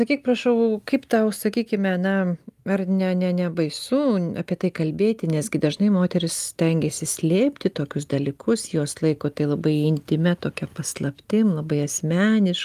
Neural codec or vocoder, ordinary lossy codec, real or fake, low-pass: none; Opus, 32 kbps; real; 14.4 kHz